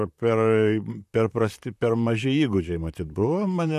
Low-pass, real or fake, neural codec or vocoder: 14.4 kHz; fake; vocoder, 44.1 kHz, 128 mel bands, Pupu-Vocoder